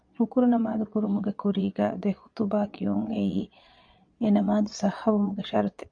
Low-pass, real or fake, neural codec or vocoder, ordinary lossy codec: 9.9 kHz; fake; vocoder, 22.05 kHz, 80 mel bands, Vocos; MP3, 64 kbps